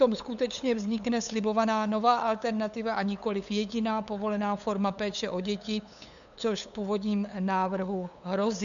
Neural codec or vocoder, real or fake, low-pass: codec, 16 kHz, 8 kbps, FunCodec, trained on LibriTTS, 25 frames a second; fake; 7.2 kHz